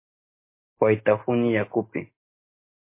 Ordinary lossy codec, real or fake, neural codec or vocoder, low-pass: MP3, 24 kbps; real; none; 3.6 kHz